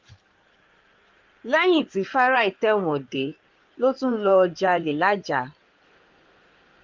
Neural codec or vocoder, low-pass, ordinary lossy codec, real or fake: codec, 16 kHz in and 24 kHz out, 2.2 kbps, FireRedTTS-2 codec; 7.2 kHz; Opus, 24 kbps; fake